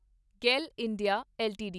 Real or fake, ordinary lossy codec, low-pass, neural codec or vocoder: real; none; none; none